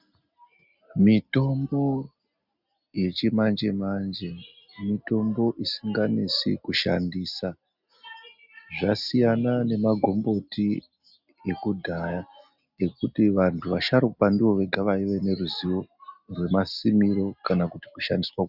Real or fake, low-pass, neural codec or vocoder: real; 5.4 kHz; none